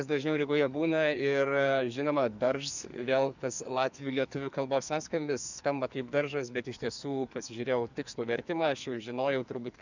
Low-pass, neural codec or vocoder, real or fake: 7.2 kHz; codec, 44.1 kHz, 2.6 kbps, SNAC; fake